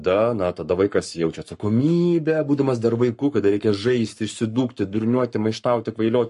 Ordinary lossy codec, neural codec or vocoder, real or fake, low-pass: MP3, 48 kbps; codec, 44.1 kHz, 7.8 kbps, Pupu-Codec; fake; 14.4 kHz